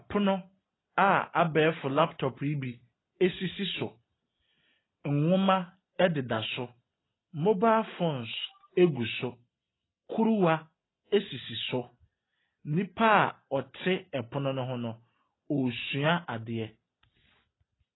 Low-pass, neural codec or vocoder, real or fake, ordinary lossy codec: 7.2 kHz; none; real; AAC, 16 kbps